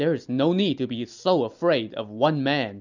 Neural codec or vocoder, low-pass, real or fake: none; 7.2 kHz; real